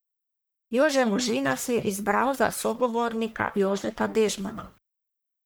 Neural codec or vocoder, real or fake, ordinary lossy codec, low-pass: codec, 44.1 kHz, 1.7 kbps, Pupu-Codec; fake; none; none